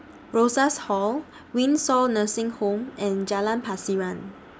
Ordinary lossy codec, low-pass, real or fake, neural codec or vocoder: none; none; real; none